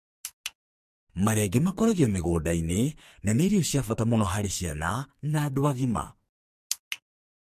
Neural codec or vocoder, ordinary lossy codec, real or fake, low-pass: codec, 44.1 kHz, 2.6 kbps, SNAC; MP3, 64 kbps; fake; 14.4 kHz